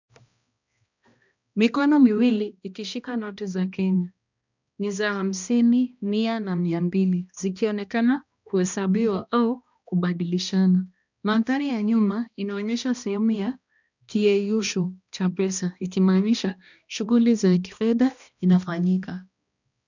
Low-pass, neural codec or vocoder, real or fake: 7.2 kHz; codec, 16 kHz, 1 kbps, X-Codec, HuBERT features, trained on balanced general audio; fake